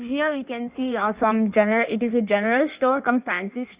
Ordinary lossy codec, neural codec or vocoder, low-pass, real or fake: Opus, 64 kbps; codec, 16 kHz in and 24 kHz out, 1.1 kbps, FireRedTTS-2 codec; 3.6 kHz; fake